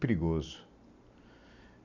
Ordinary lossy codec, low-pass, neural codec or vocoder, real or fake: none; 7.2 kHz; none; real